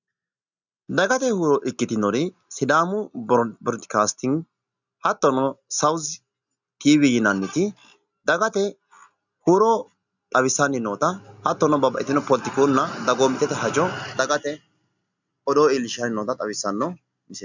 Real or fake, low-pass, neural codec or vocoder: real; 7.2 kHz; none